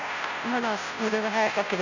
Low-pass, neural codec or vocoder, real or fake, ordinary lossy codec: 7.2 kHz; codec, 16 kHz, 0.5 kbps, FunCodec, trained on Chinese and English, 25 frames a second; fake; none